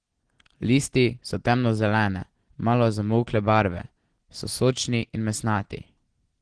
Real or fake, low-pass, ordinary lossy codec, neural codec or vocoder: real; 9.9 kHz; Opus, 16 kbps; none